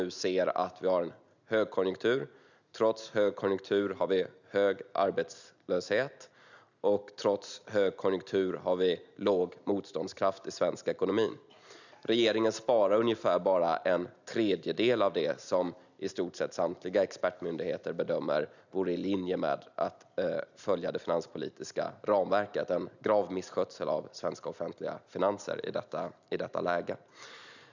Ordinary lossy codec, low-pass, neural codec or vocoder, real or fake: none; 7.2 kHz; none; real